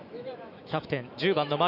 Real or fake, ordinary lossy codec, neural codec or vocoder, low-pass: fake; none; autoencoder, 48 kHz, 128 numbers a frame, DAC-VAE, trained on Japanese speech; 5.4 kHz